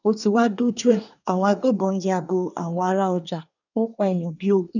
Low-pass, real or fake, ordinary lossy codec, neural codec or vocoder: 7.2 kHz; fake; none; codec, 24 kHz, 1 kbps, SNAC